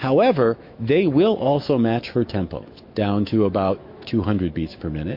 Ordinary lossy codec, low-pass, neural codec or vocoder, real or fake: MP3, 32 kbps; 5.4 kHz; autoencoder, 48 kHz, 128 numbers a frame, DAC-VAE, trained on Japanese speech; fake